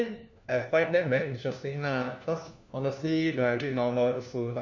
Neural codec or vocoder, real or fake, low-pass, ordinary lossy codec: codec, 16 kHz, 1 kbps, FunCodec, trained on LibriTTS, 50 frames a second; fake; 7.2 kHz; none